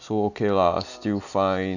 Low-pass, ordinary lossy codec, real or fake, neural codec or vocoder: 7.2 kHz; none; real; none